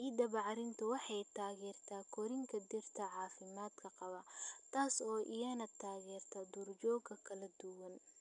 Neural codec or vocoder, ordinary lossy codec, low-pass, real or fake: none; none; 10.8 kHz; real